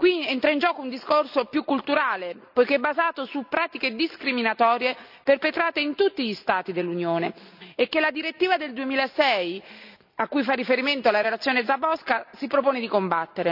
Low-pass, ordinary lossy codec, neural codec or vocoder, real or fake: 5.4 kHz; none; none; real